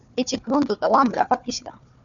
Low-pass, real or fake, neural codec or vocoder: 7.2 kHz; fake; codec, 16 kHz, 4 kbps, FunCodec, trained on Chinese and English, 50 frames a second